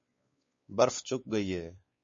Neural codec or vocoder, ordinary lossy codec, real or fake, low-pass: codec, 16 kHz, 4 kbps, X-Codec, WavLM features, trained on Multilingual LibriSpeech; MP3, 32 kbps; fake; 7.2 kHz